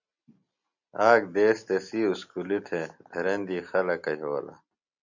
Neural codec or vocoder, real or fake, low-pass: none; real; 7.2 kHz